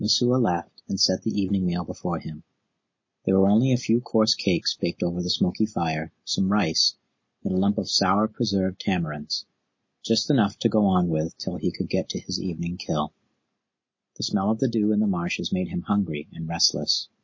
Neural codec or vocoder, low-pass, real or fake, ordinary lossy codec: none; 7.2 kHz; real; MP3, 32 kbps